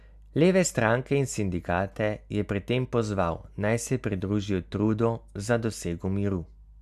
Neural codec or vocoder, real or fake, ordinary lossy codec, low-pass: none; real; none; 14.4 kHz